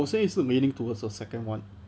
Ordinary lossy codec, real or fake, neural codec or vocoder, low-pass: none; real; none; none